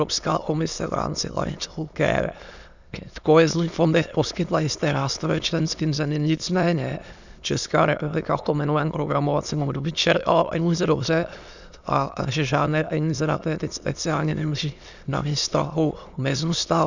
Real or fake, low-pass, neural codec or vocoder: fake; 7.2 kHz; autoencoder, 22.05 kHz, a latent of 192 numbers a frame, VITS, trained on many speakers